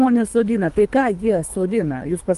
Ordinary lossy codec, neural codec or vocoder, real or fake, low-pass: Opus, 32 kbps; codec, 24 kHz, 3 kbps, HILCodec; fake; 10.8 kHz